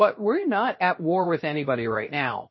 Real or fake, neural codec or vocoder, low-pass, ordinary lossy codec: fake; codec, 16 kHz, 0.7 kbps, FocalCodec; 7.2 kHz; MP3, 24 kbps